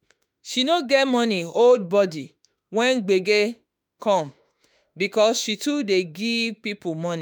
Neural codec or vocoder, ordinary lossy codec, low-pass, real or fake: autoencoder, 48 kHz, 32 numbers a frame, DAC-VAE, trained on Japanese speech; none; none; fake